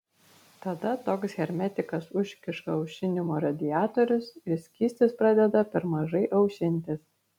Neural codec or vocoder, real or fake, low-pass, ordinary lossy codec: none; real; 19.8 kHz; MP3, 96 kbps